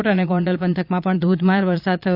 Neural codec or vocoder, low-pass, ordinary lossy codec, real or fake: vocoder, 22.05 kHz, 80 mel bands, Vocos; 5.4 kHz; AAC, 48 kbps; fake